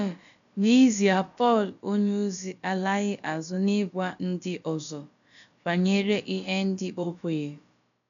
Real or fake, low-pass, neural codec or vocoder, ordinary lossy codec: fake; 7.2 kHz; codec, 16 kHz, about 1 kbps, DyCAST, with the encoder's durations; none